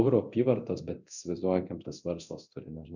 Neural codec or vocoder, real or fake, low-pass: codec, 24 kHz, 0.9 kbps, DualCodec; fake; 7.2 kHz